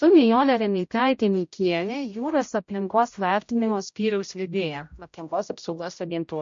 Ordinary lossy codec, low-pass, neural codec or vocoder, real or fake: MP3, 48 kbps; 7.2 kHz; codec, 16 kHz, 0.5 kbps, X-Codec, HuBERT features, trained on general audio; fake